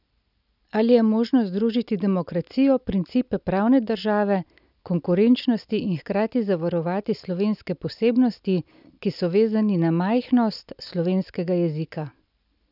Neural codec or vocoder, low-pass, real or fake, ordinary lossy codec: none; 5.4 kHz; real; none